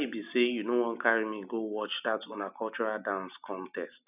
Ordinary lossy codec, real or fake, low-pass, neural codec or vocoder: none; real; 3.6 kHz; none